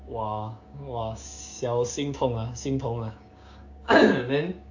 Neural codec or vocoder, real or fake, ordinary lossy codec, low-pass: codec, 16 kHz, 6 kbps, DAC; fake; AAC, 48 kbps; 7.2 kHz